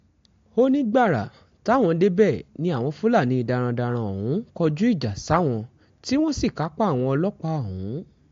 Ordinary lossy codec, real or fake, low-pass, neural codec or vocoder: AAC, 48 kbps; real; 7.2 kHz; none